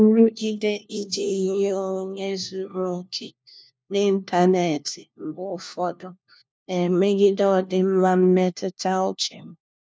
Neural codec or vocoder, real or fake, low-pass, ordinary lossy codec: codec, 16 kHz, 1 kbps, FunCodec, trained on LibriTTS, 50 frames a second; fake; none; none